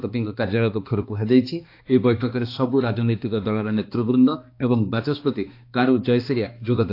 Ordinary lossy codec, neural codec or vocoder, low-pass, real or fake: AAC, 32 kbps; codec, 16 kHz, 2 kbps, X-Codec, HuBERT features, trained on balanced general audio; 5.4 kHz; fake